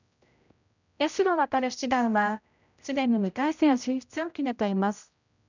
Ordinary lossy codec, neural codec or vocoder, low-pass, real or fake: none; codec, 16 kHz, 0.5 kbps, X-Codec, HuBERT features, trained on general audio; 7.2 kHz; fake